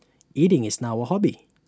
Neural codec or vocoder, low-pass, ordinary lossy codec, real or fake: none; none; none; real